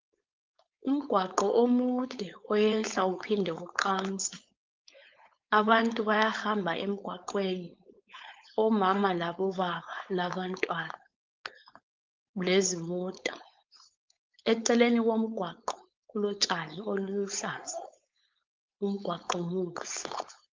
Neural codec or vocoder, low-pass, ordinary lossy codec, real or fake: codec, 16 kHz, 4.8 kbps, FACodec; 7.2 kHz; Opus, 24 kbps; fake